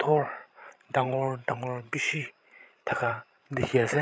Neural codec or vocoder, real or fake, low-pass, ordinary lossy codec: codec, 16 kHz, 16 kbps, FreqCodec, larger model; fake; none; none